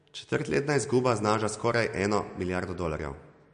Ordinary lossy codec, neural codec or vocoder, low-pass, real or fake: MP3, 48 kbps; none; 9.9 kHz; real